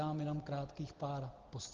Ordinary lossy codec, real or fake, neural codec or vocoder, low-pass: Opus, 16 kbps; real; none; 7.2 kHz